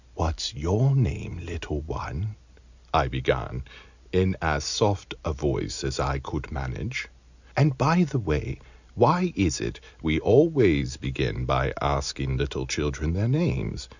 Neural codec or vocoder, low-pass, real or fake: none; 7.2 kHz; real